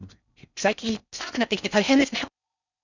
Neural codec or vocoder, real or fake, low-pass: codec, 16 kHz in and 24 kHz out, 0.6 kbps, FocalCodec, streaming, 4096 codes; fake; 7.2 kHz